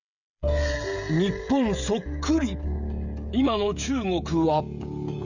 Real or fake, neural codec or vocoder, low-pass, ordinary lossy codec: fake; codec, 16 kHz, 16 kbps, FreqCodec, smaller model; 7.2 kHz; none